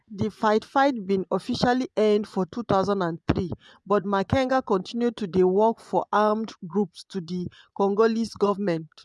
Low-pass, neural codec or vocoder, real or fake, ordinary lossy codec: none; vocoder, 24 kHz, 100 mel bands, Vocos; fake; none